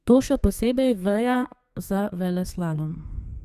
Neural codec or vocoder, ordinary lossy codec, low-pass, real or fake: codec, 32 kHz, 1.9 kbps, SNAC; Opus, 64 kbps; 14.4 kHz; fake